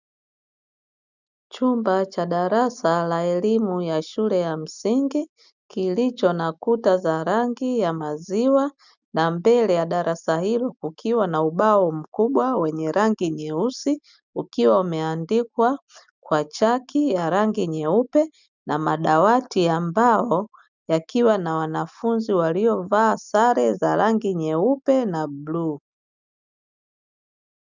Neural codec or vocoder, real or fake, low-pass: none; real; 7.2 kHz